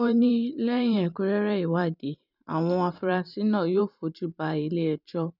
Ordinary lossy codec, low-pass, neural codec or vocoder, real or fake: none; 5.4 kHz; vocoder, 44.1 kHz, 128 mel bands every 512 samples, BigVGAN v2; fake